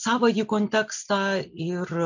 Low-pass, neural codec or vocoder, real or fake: 7.2 kHz; none; real